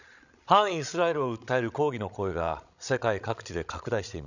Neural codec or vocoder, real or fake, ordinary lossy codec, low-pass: codec, 16 kHz, 16 kbps, FreqCodec, larger model; fake; none; 7.2 kHz